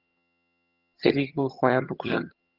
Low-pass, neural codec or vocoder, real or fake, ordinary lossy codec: 5.4 kHz; vocoder, 22.05 kHz, 80 mel bands, HiFi-GAN; fake; Opus, 24 kbps